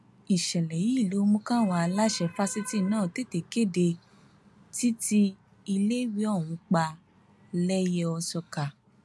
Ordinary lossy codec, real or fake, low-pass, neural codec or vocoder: none; real; none; none